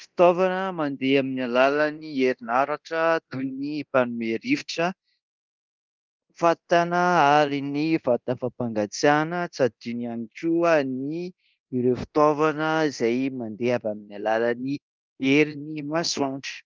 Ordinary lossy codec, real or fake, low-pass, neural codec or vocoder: Opus, 32 kbps; fake; 7.2 kHz; codec, 24 kHz, 0.9 kbps, DualCodec